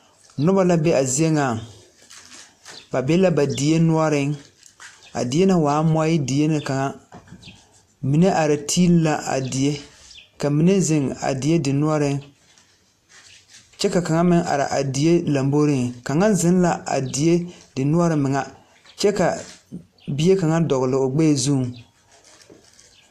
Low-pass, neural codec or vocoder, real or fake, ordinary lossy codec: 14.4 kHz; none; real; AAC, 64 kbps